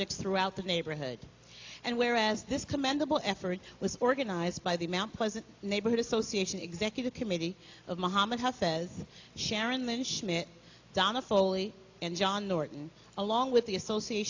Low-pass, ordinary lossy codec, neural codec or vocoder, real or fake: 7.2 kHz; AAC, 48 kbps; none; real